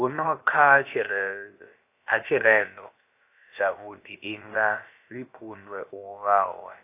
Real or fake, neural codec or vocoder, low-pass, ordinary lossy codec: fake; codec, 16 kHz, about 1 kbps, DyCAST, with the encoder's durations; 3.6 kHz; AAC, 24 kbps